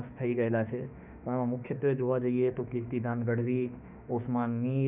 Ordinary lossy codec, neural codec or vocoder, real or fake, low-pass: none; autoencoder, 48 kHz, 32 numbers a frame, DAC-VAE, trained on Japanese speech; fake; 3.6 kHz